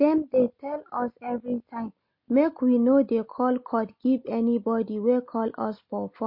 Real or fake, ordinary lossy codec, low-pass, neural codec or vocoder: real; MP3, 32 kbps; 5.4 kHz; none